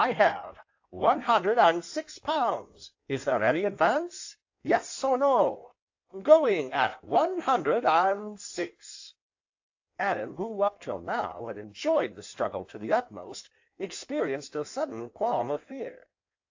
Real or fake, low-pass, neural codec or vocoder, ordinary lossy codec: fake; 7.2 kHz; codec, 16 kHz in and 24 kHz out, 1.1 kbps, FireRedTTS-2 codec; AAC, 48 kbps